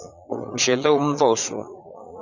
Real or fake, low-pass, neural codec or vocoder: fake; 7.2 kHz; vocoder, 22.05 kHz, 80 mel bands, Vocos